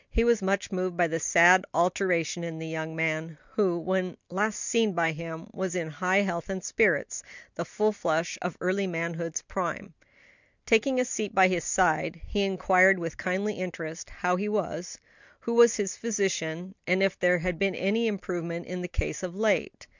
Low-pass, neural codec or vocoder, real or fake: 7.2 kHz; none; real